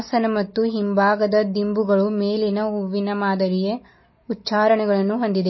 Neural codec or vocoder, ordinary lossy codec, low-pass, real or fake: none; MP3, 24 kbps; 7.2 kHz; real